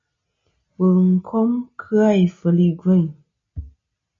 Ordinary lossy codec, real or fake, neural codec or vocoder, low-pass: MP3, 32 kbps; real; none; 7.2 kHz